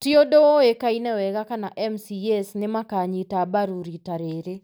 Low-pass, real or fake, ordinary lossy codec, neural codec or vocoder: none; real; none; none